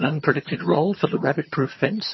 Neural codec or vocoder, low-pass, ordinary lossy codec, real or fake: vocoder, 22.05 kHz, 80 mel bands, HiFi-GAN; 7.2 kHz; MP3, 24 kbps; fake